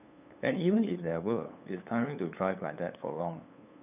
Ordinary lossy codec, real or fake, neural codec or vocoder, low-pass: none; fake; codec, 16 kHz, 8 kbps, FunCodec, trained on LibriTTS, 25 frames a second; 3.6 kHz